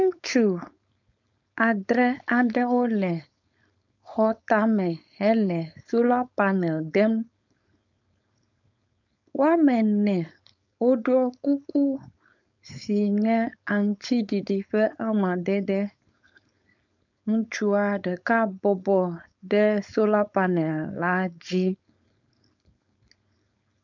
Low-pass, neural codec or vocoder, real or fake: 7.2 kHz; codec, 16 kHz, 4.8 kbps, FACodec; fake